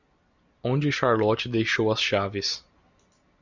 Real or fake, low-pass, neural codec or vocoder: real; 7.2 kHz; none